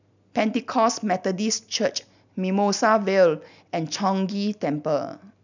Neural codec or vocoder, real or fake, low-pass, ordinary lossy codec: none; real; 7.2 kHz; none